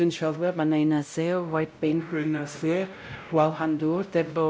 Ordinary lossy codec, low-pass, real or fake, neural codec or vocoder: none; none; fake; codec, 16 kHz, 0.5 kbps, X-Codec, WavLM features, trained on Multilingual LibriSpeech